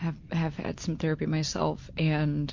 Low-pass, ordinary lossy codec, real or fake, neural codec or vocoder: 7.2 kHz; MP3, 48 kbps; real; none